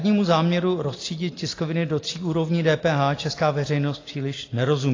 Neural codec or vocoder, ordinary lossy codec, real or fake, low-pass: none; AAC, 32 kbps; real; 7.2 kHz